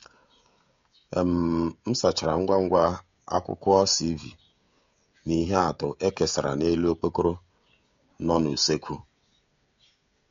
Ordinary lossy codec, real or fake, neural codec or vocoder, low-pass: MP3, 48 kbps; fake; codec, 16 kHz, 16 kbps, FreqCodec, smaller model; 7.2 kHz